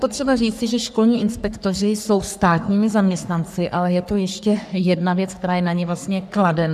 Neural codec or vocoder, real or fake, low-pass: codec, 44.1 kHz, 3.4 kbps, Pupu-Codec; fake; 14.4 kHz